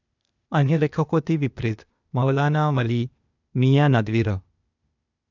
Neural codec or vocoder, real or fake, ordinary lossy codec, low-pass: codec, 16 kHz, 0.8 kbps, ZipCodec; fake; none; 7.2 kHz